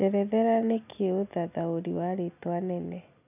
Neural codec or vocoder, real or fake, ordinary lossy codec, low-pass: none; real; none; 3.6 kHz